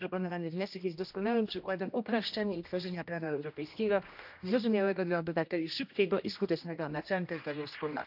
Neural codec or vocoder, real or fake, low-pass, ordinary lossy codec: codec, 16 kHz, 1 kbps, X-Codec, HuBERT features, trained on general audio; fake; 5.4 kHz; none